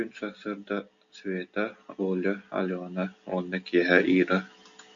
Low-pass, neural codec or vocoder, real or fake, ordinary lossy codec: 7.2 kHz; none; real; AAC, 64 kbps